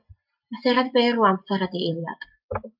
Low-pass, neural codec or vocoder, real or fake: 5.4 kHz; none; real